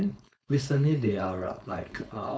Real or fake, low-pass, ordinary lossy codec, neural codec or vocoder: fake; none; none; codec, 16 kHz, 4.8 kbps, FACodec